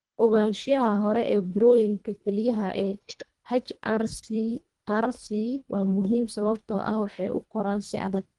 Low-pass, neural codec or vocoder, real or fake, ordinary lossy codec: 10.8 kHz; codec, 24 kHz, 1.5 kbps, HILCodec; fake; Opus, 24 kbps